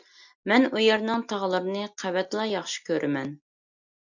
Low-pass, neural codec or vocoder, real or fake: 7.2 kHz; none; real